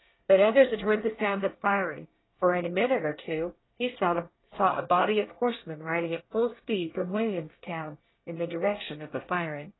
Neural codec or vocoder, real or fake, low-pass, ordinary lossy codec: codec, 24 kHz, 1 kbps, SNAC; fake; 7.2 kHz; AAC, 16 kbps